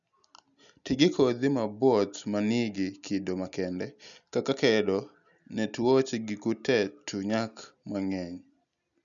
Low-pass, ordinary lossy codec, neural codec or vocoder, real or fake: 7.2 kHz; none; none; real